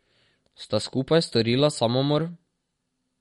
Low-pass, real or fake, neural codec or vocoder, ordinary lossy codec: 14.4 kHz; real; none; MP3, 48 kbps